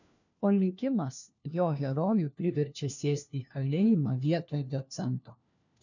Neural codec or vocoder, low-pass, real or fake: codec, 16 kHz, 1 kbps, FunCodec, trained on LibriTTS, 50 frames a second; 7.2 kHz; fake